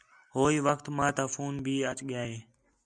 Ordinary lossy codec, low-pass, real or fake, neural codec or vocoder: MP3, 96 kbps; 9.9 kHz; real; none